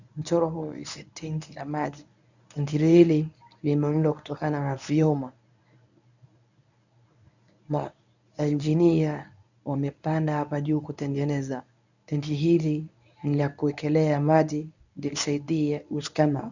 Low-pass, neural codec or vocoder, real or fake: 7.2 kHz; codec, 24 kHz, 0.9 kbps, WavTokenizer, medium speech release version 1; fake